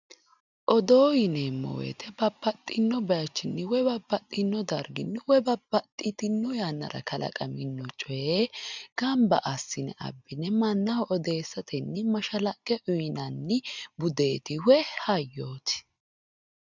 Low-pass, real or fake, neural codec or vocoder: 7.2 kHz; real; none